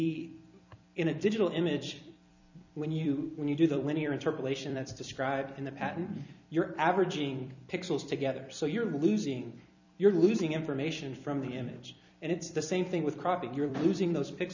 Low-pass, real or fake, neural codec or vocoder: 7.2 kHz; fake; vocoder, 44.1 kHz, 128 mel bands every 256 samples, BigVGAN v2